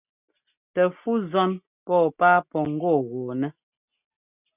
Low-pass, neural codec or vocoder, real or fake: 3.6 kHz; none; real